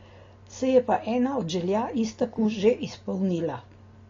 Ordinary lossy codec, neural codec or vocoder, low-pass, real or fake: AAC, 32 kbps; none; 7.2 kHz; real